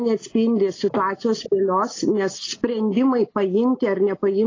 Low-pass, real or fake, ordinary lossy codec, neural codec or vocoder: 7.2 kHz; real; AAC, 32 kbps; none